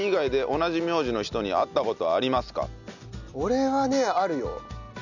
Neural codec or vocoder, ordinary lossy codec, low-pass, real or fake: none; none; 7.2 kHz; real